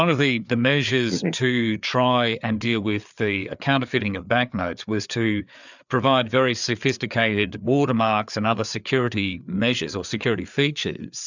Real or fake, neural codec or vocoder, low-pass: fake; codec, 16 kHz, 4 kbps, FreqCodec, larger model; 7.2 kHz